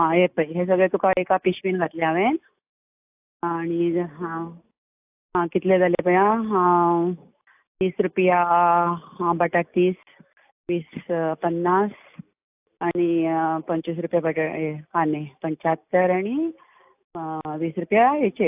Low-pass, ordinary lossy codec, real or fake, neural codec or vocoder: 3.6 kHz; AAC, 32 kbps; real; none